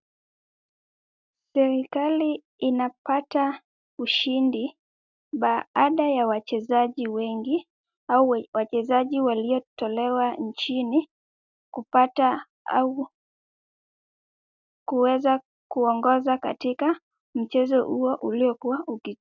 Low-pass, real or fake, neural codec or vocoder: 7.2 kHz; real; none